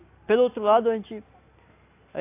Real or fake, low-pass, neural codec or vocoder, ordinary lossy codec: real; 3.6 kHz; none; AAC, 24 kbps